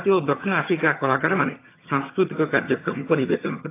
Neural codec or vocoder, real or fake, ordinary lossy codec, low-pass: vocoder, 22.05 kHz, 80 mel bands, HiFi-GAN; fake; AAC, 24 kbps; 3.6 kHz